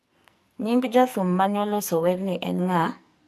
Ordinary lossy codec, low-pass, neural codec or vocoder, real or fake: none; 14.4 kHz; codec, 44.1 kHz, 2.6 kbps, SNAC; fake